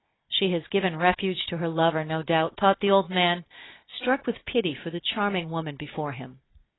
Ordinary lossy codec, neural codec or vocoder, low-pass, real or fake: AAC, 16 kbps; none; 7.2 kHz; real